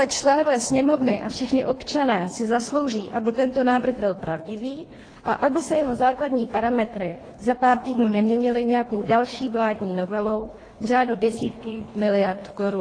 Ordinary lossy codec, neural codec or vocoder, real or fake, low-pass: AAC, 32 kbps; codec, 24 kHz, 1.5 kbps, HILCodec; fake; 9.9 kHz